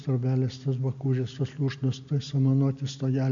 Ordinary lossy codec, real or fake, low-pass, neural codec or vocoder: AAC, 64 kbps; real; 7.2 kHz; none